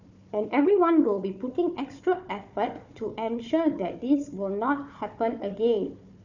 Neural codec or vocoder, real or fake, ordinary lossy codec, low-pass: codec, 16 kHz, 4 kbps, FunCodec, trained on Chinese and English, 50 frames a second; fake; none; 7.2 kHz